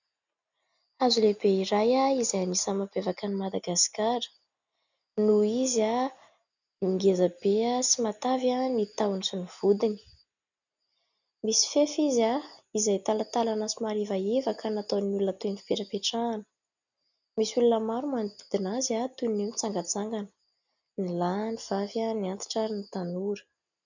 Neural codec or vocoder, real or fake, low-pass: none; real; 7.2 kHz